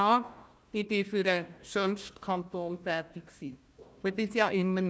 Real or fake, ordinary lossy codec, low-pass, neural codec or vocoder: fake; none; none; codec, 16 kHz, 1 kbps, FunCodec, trained on Chinese and English, 50 frames a second